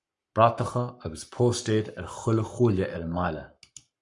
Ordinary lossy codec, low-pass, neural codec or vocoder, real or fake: Opus, 64 kbps; 10.8 kHz; codec, 44.1 kHz, 7.8 kbps, Pupu-Codec; fake